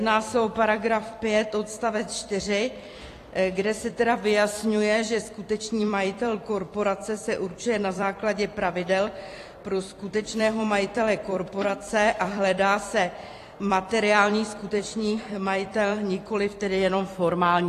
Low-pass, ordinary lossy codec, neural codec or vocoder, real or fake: 14.4 kHz; AAC, 48 kbps; vocoder, 44.1 kHz, 128 mel bands every 256 samples, BigVGAN v2; fake